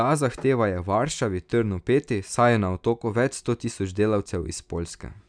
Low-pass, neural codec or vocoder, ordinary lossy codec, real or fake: 9.9 kHz; none; none; real